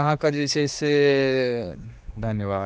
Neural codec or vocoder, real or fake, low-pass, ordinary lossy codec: codec, 16 kHz, 2 kbps, X-Codec, HuBERT features, trained on general audio; fake; none; none